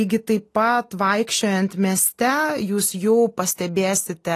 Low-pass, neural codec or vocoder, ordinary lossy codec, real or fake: 14.4 kHz; none; AAC, 48 kbps; real